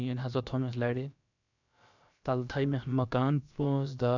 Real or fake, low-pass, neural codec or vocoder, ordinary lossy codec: fake; 7.2 kHz; codec, 16 kHz, about 1 kbps, DyCAST, with the encoder's durations; none